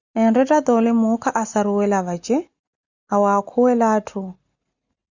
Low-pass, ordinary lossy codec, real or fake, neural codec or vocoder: 7.2 kHz; Opus, 64 kbps; real; none